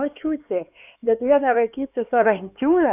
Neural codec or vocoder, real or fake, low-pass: codec, 16 kHz, 4 kbps, X-Codec, HuBERT features, trained on LibriSpeech; fake; 3.6 kHz